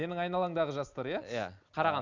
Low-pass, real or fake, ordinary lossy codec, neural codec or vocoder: 7.2 kHz; real; none; none